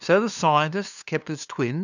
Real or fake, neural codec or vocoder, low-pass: fake; codec, 16 kHz, 2 kbps, FunCodec, trained on LibriTTS, 25 frames a second; 7.2 kHz